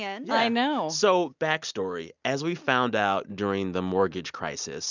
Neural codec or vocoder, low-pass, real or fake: none; 7.2 kHz; real